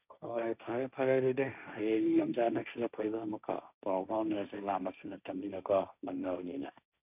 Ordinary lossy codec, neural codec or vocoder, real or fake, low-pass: none; codec, 16 kHz, 1.1 kbps, Voila-Tokenizer; fake; 3.6 kHz